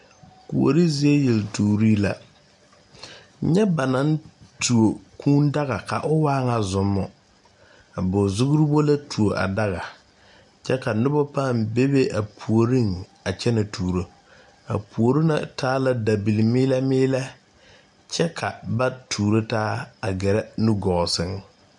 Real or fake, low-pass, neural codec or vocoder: real; 10.8 kHz; none